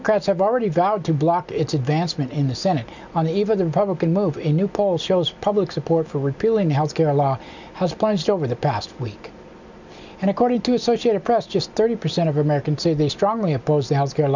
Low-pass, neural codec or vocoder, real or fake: 7.2 kHz; none; real